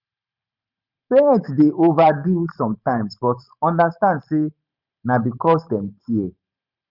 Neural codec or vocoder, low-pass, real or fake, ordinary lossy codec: vocoder, 44.1 kHz, 128 mel bands every 512 samples, BigVGAN v2; 5.4 kHz; fake; none